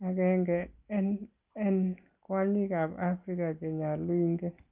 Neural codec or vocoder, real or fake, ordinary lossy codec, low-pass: none; real; Opus, 24 kbps; 3.6 kHz